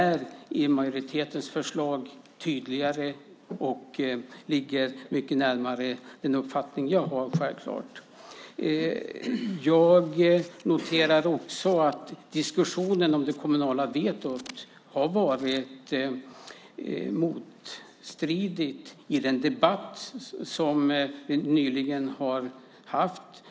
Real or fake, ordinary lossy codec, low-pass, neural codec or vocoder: real; none; none; none